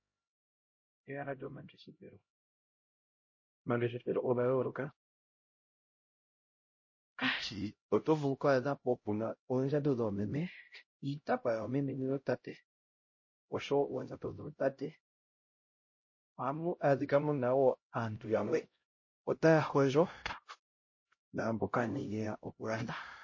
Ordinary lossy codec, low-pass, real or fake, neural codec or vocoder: MP3, 32 kbps; 7.2 kHz; fake; codec, 16 kHz, 0.5 kbps, X-Codec, HuBERT features, trained on LibriSpeech